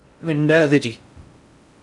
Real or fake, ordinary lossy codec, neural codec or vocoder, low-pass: fake; MP3, 96 kbps; codec, 16 kHz in and 24 kHz out, 0.6 kbps, FocalCodec, streaming, 4096 codes; 10.8 kHz